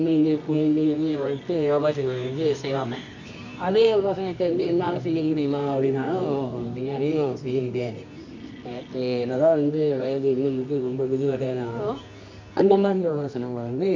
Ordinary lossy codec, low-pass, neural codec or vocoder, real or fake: MP3, 64 kbps; 7.2 kHz; codec, 24 kHz, 0.9 kbps, WavTokenizer, medium music audio release; fake